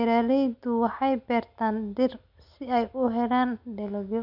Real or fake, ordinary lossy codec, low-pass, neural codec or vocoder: real; none; 5.4 kHz; none